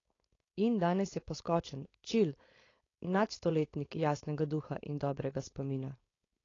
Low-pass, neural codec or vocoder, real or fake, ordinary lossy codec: 7.2 kHz; codec, 16 kHz, 4.8 kbps, FACodec; fake; AAC, 32 kbps